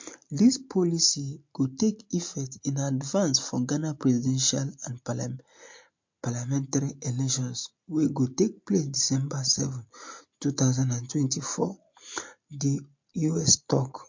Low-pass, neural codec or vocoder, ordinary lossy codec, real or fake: 7.2 kHz; none; MP3, 48 kbps; real